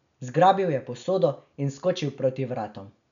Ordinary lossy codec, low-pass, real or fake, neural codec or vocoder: none; 7.2 kHz; real; none